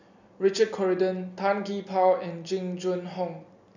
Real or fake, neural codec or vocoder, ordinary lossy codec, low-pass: real; none; none; 7.2 kHz